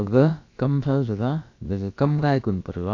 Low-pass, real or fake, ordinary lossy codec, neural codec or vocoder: 7.2 kHz; fake; AAC, 48 kbps; codec, 16 kHz, about 1 kbps, DyCAST, with the encoder's durations